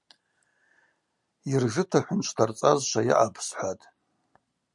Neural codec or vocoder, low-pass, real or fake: none; 10.8 kHz; real